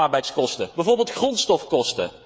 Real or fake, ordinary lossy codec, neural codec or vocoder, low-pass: fake; none; codec, 16 kHz, 16 kbps, FreqCodec, smaller model; none